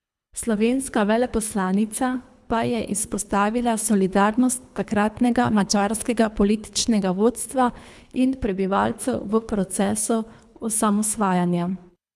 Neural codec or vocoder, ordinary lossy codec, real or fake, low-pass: codec, 24 kHz, 3 kbps, HILCodec; none; fake; none